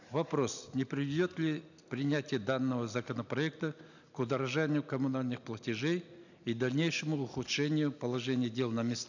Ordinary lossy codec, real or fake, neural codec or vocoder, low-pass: none; real; none; 7.2 kHz